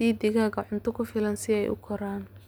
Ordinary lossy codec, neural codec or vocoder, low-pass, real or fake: none; none; none; real